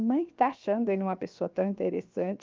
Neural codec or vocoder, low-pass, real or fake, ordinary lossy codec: codec, 16 kHz, 0.7 kbps, FocalCodec; 7.2 kHz; fake; Opus, 24 kbps